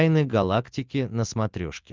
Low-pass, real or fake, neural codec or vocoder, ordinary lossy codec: 7.2 kHz; real; none; Opus, 24 kbps